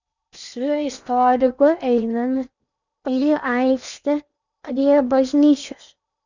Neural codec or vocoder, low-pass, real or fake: codec, 16 kHz in and 24 kHz out, 0.8 kbps, FocalCodec, streaming, 65536 codes; 7.2 kHz; fake